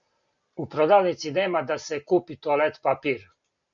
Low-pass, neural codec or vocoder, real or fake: 7.2 kHz; none; real